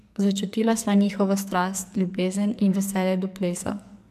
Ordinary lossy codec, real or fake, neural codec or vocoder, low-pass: MP3, 96 kbps; fake; codec, 44.1 kHz, 2.6 kbps, SNAC; 14.4 kHz